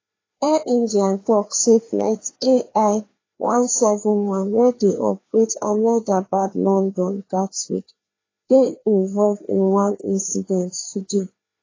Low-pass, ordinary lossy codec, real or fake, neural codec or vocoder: 7.2 kHz; AAC, 32 kbps; fake; codec, 16 kHz, 2 kbps, FreqCodec, larger model